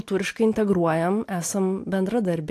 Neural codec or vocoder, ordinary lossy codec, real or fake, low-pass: none; AAC, 64 kbps; real; 14.4 kHz